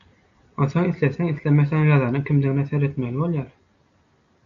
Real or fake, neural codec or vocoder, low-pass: real; none; 7.2 kHz